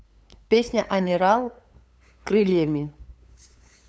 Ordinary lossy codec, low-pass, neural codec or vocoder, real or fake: none; none; codec, 16 kHz, 8 kbps, FunCodec, trained on LibriTTS, 25 frames a second; fake